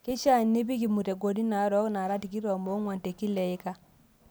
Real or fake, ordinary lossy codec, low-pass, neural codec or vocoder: real; none; none; none